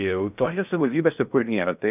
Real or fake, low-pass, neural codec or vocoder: fake; 3.6 kHz; codec, 16 kHz in and 24 kHz out, 0.6 kbps, FocalCodec, streaming, 2048 codes